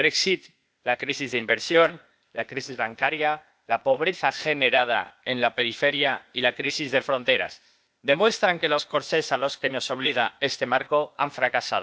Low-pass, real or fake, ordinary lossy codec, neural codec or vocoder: none; fake; none; codec, 16 kHz, 0.8 kbps, ZipCodec